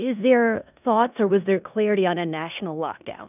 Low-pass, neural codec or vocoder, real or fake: 3.6 kHz; codec, 16 kHz in and 24 kHz out, 0.9 kbps, LongCat-Audio-Codec, four codebook decoder; fake